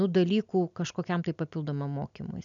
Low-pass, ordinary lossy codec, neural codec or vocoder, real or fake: 7.2 kHz; MP3, 96 kbps; none; real